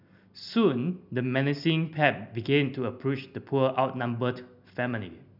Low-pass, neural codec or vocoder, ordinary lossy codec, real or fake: 5.4 kHz; none; none; real